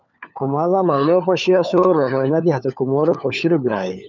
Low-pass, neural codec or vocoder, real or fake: 7.2 kHz; codec, 16 kHz, 4 kbps, FunCodec, trained on LibriTTS, 50 frames a second; fake